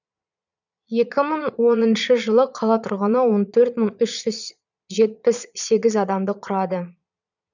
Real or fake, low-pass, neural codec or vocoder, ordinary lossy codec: fake; 7.2 kHz; vocoder, 22.05 kHz, 80 mel bands, Vocos; none